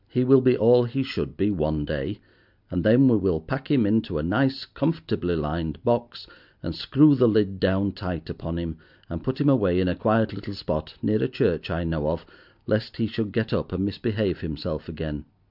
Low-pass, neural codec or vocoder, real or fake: 5.4 kHz; none; real